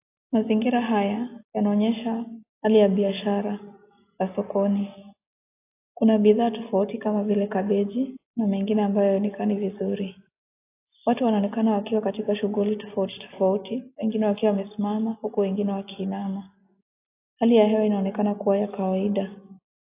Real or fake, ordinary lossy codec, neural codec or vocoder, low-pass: real; AAC, 24 kbps; none; 3.6 kHz